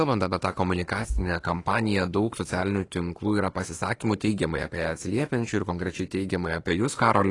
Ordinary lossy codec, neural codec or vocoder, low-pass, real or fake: AAC, 32 kbps; autoencoder, 48 kHz, 32 numbers a frame, DAC-VAE, trained on Japanese speech; 10.8 kHz; fake